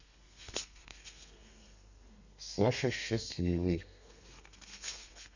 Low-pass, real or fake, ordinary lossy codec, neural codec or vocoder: 7.2 kHz; fake; none; codec, 32 kHz, 1.9 kbps, SNAC